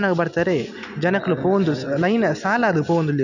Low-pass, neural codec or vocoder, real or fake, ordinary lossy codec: 7.2 kHz; none; real; none